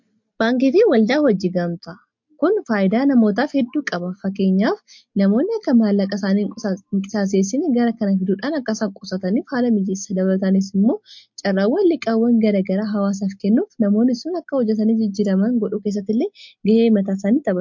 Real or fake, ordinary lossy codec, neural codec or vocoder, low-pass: real; MP3, 48 kbps; none; 7.2 kHz